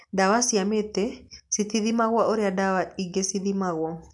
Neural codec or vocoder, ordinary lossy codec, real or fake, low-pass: none; none; real; 10.8 kHz